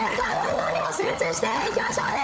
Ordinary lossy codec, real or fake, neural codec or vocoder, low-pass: none; fake; codec, 16 kHz, 16 kbps, FunCodec, trained on LibriTTS, 50 frames a second; none